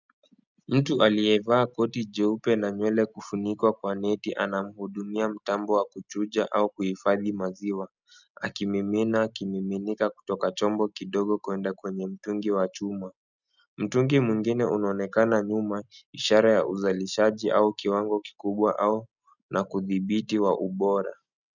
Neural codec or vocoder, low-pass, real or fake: none; 7.2 kHz; real